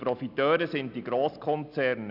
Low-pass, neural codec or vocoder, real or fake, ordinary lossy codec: 5.4 kHz; none; real; none